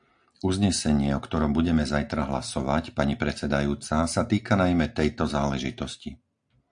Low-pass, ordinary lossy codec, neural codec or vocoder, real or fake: 9.9 kHz; AAC, 64 kbps; none; real